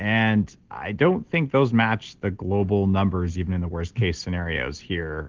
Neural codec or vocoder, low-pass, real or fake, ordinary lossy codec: none; 7.2 kHz; real; Opus, 16 kbps